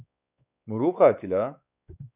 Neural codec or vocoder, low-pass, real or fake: codec, 16 kHz, 4 kbps, X-Codec, WavLM features, trained on Multilingual LibriSpeech; 3.6 kHz; fake